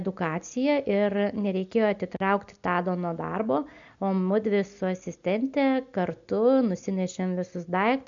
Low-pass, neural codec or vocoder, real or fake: 7.2 kHz; none; real